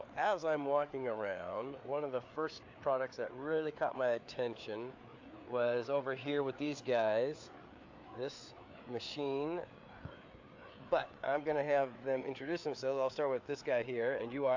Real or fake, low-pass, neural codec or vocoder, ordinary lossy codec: fake; 7.2 kHz; codec, 16 kHz, 4 kbps, FreqCodec, larger model; AAC, 48 kbps